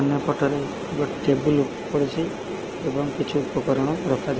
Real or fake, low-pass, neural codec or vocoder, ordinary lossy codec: real; 7.2 kHz; none; Opus, 16 kbps